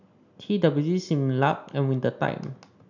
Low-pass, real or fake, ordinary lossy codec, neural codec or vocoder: 7.2 kHz; real; none; none